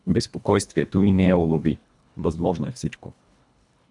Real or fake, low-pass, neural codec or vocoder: fake; 10.8 kHz; codec, 24 kHz, 1.5 kbps, HILCodec